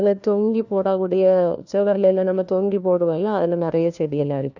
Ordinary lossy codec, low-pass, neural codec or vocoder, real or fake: none; 7.2 kHz; codec, 16 kHz, 1 kbps, FunCodec, trained on LibriTTS, 50 frames a second; fake